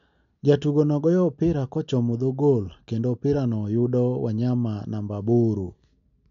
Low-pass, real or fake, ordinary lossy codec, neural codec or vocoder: 7.2 kHz; real; none; none